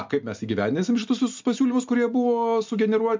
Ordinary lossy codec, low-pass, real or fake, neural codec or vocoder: MP3, 64 kbps; 7.2 kHz; real; none